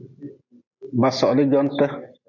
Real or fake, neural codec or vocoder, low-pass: real; none; 7.2 kHz